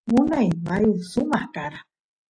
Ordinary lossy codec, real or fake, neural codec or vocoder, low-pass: AAC, 32 kbps; real; none; 9.9 kHz